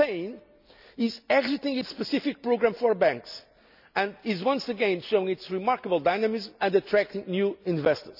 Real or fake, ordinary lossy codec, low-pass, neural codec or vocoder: real; none; 5.4 kHz; none